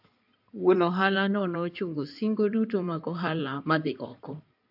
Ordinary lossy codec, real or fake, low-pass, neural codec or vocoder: AAC, 48 kbps; fake; 5.4 kHz; codec, 16 kHz in and 24 kHz out, 2.2 kbps, FireRedTTS-2 codec